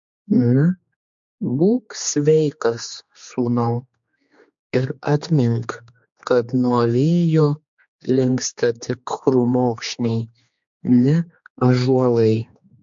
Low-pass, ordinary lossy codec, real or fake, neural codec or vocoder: 7.2 kHz; MP3, 48 kbps; fake; codec, 16 kHz, 2 kbps, X-Codec, HuBERT features, trained on general audio